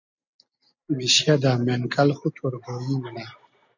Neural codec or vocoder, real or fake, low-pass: none; real; 7.2 kHz